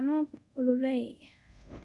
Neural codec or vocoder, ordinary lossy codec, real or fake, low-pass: codec, 24 kHz, 0.5 kbps, DualCodec; none; fake; 10.8 kHz